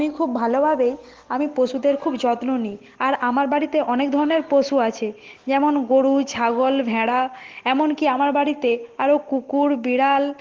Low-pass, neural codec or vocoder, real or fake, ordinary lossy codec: 7.2 kHz; none; real; Opus, 16 kbps